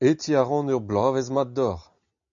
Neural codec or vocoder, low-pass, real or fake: none; 7.2 kHz; real